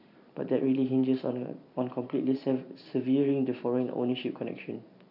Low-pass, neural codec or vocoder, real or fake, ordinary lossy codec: 5.4 kHz; vocoder, 44.1 kHz, 128 mel bands every 512 samples, BigVGAN v2; fake; none